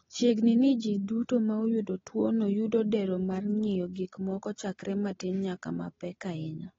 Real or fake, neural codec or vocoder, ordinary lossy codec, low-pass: real; none; AAC, 24 kbps; 7.2 kHz